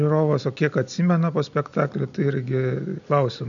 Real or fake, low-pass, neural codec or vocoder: real; 7.2 kHz; none